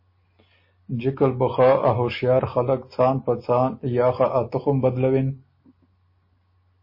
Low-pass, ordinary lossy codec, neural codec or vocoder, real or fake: 5.4 kHz; MP3, 24 kbps; none; real